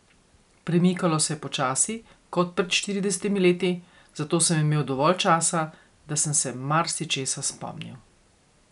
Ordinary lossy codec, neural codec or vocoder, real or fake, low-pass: none; none; real; 10.8 kHz